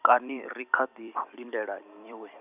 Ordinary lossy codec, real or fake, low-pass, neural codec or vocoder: none; fake; 3.6 kHz; vocoder, 44.1 kHz, 128 mel bands every 256 samples, BigVGAN v2